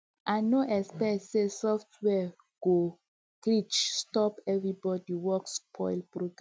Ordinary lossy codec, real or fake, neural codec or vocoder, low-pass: none; real; none; none